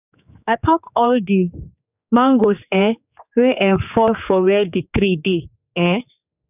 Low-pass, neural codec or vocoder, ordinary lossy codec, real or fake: 3.6 kHz; codec, 16 kHz, 4 kbps, X-Codec, HuBERT features, trained on general audio; none; fake